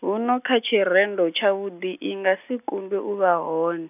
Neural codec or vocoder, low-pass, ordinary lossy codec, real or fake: none; 3.6 kHz; none; real